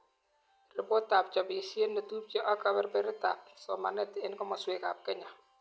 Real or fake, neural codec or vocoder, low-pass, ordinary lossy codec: real; none; none; none